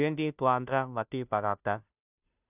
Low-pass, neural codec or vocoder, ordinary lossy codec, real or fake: 3.6 kHz; codec, 16 kHz, 0.5 kbps, FunCodec, trained on Chinese and English, 25 frames a second; none; fake